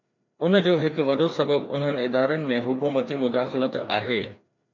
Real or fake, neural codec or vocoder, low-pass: fake; codec, 16 kHz, 2 kbps, FreqCodec, larger model; 7.2 kHz